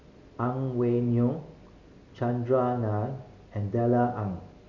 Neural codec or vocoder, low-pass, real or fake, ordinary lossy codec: none; 7.2 kHz; real; none